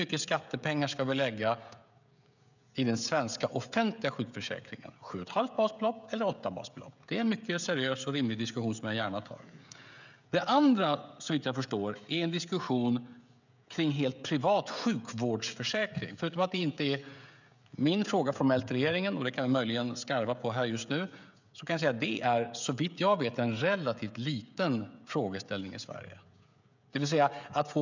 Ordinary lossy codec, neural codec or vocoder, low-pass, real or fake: none; codec, 16 kHz, 16 kbps, FreqCodec, smaller model; 7.2 kHz; fake